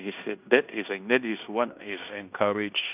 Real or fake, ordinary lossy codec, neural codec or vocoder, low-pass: fake; none; codec, 16 kHz in and 24 kHz out, 0.9 kbps, LongCat-Audio-Codec, fine tuned four codebook decoder; 3.6 kHz